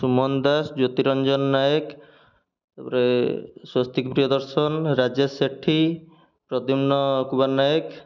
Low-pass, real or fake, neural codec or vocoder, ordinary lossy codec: 7.2 kHz; real; none; none